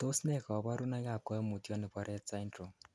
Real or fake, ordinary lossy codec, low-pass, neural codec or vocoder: real; none; none; none